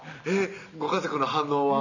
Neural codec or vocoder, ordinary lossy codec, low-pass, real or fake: none; none; 7.2 kHz; real